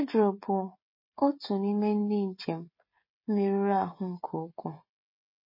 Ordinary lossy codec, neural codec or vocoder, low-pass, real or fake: MP3, 24 kbps; codec, 16 kHz, 16 kbps, FreqCodec, smaller model; 5.4 kHz; fake